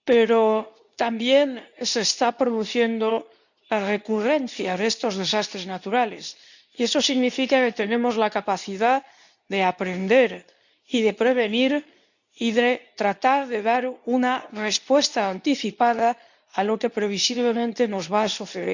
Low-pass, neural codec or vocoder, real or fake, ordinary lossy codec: 7.2 kHz; codec, 24 kHz, 0.9 kbps, WavTokenizer, medium speech release version 2; fake; none